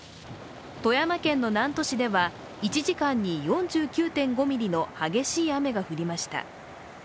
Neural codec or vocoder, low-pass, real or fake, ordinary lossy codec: none; none; real; none